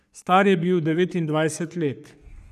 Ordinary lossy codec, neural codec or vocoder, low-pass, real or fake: none; codec, 44.1 kHz, 3.4 kbps, Pupu-Codec; 14.4 kHz; fake